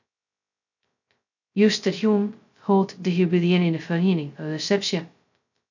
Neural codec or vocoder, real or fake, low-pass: codec, 16 kHz, 0.2 kbps, FocalCodec; fake; 7.2 kHz